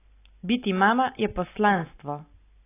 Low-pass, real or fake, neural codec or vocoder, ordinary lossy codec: 3.6 kHz; real; none; AAC, 24 kbps